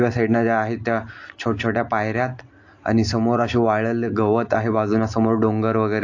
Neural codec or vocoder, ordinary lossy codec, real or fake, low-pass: none; AAC, 48 kbps; real; 7.2 kHz